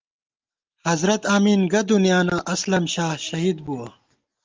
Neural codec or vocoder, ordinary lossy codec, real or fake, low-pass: none; Opus, 32 kbps; real; 7.2 kHz